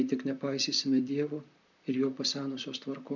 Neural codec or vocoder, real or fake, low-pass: vocoder, 44.1 kHz, 128 mel bands, Pupu-Vocoder; fake; 7.2 kHz